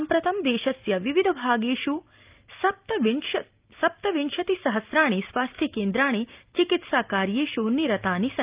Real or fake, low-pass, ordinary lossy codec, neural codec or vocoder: real; 3.6 kHz; Opus, 24 kbps; none